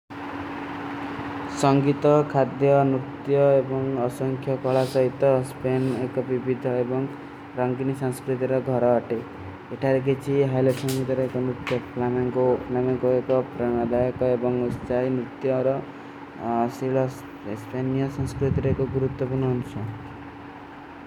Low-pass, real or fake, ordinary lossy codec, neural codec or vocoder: 19.8 kHz; real; none; none